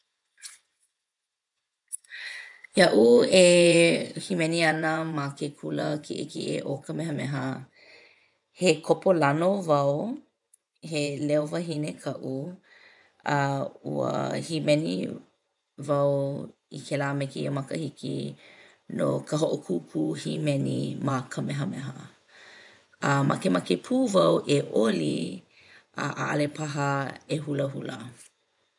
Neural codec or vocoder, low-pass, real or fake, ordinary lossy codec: vocoder, 24 kHz, 100 mel bands, Vocos; 10.8 kHz; fake; none